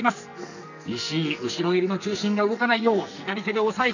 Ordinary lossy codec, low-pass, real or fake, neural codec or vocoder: none; 7.2 kHz; fake; codec, 44.1 kHz, 2.6 kbps, SNAC